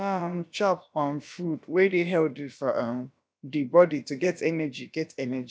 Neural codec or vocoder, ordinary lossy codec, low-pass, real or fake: codec, 16 kHz, about 1 kbps, DyCAST, with the encoder's durations; none; none; fake